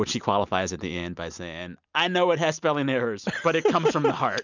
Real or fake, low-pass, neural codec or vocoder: real; 7.2 kHz; none